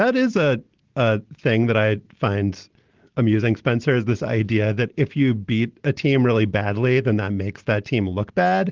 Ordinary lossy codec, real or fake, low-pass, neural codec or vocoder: Opus, 16 kbps; real; 7.2 kHz; none